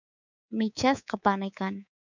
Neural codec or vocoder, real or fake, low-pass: codec, 24 kHz, 3.1 kbps, DualCodec; fake; 7.2 kHz